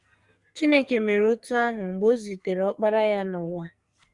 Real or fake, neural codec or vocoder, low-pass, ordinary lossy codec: fake; codec, 44.1 kHz, 2.6 kbps, SNAC; 10.8 kHz; Opus, 64 kbps